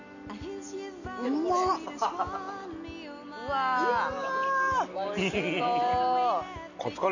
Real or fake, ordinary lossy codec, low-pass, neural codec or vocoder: real; none; 7.2 kHz; none